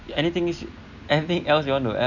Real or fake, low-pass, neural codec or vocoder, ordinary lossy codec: real; 7.2 kHz; none; none